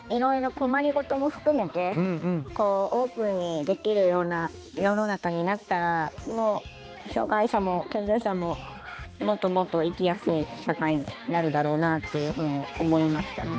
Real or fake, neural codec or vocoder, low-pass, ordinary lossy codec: fake; codec, 16 kHz, 2 kbps, X-Codec, HuBERT features, trained on balanced general audio; none; none